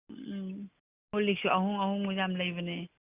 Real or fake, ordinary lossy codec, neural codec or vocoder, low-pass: real; Opus, 64 kbps; none; 3.6 kHz